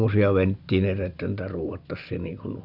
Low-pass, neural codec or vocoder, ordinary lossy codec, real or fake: 5.4 kHz; none; none; real